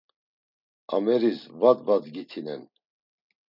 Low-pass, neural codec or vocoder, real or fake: 5.4 kHz; none; real